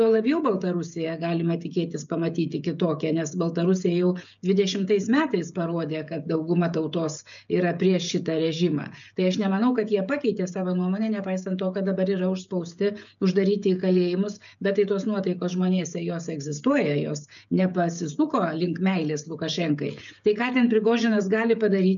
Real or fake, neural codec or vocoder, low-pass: fake; codec, 16 kHz, 16 kbps, FreqCodec, smaller model; 7.2 kHz